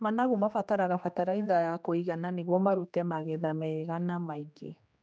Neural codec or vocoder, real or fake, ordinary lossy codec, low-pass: codec, 16 kHz, 2 kbps, X-Codec, HuBERT features, trained on general audio; fake; none; none